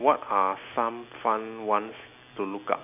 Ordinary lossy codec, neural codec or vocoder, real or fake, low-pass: none; none; real; 3.6 kHz